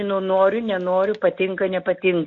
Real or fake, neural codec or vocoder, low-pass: real; none; 7.2 kHz